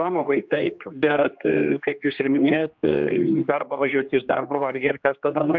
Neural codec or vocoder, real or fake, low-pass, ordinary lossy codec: codec, 16 kHz, 2 kbps, X-Codec, HuBERT features, trained on general audio; fake; 7.2 kHz; MP3, 64 kbps